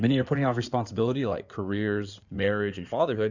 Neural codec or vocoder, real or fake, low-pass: codec, 16 kHz in and 24 kHz out, 2.2 kbps, FireRedTTS-2 codec; fake; 7.2 kHz